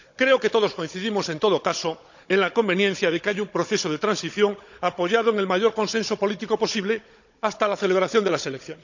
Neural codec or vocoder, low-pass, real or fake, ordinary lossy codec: codec, 16 kHz, 16 kbps, FunCodec, trained on Chinese and English, 50 frames a second; 7.2 kHz; fake; none